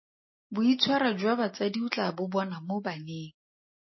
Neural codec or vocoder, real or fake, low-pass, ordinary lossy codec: none; real; 7.2 kHz; MP3, 24 kbps